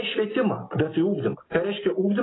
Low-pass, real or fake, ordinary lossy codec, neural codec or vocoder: 7.2 kHz; real; AAC, 16 kbps; none